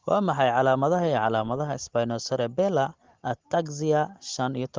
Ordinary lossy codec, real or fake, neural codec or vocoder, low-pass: Opus, 16 kbps; real; none; 7.2 kHz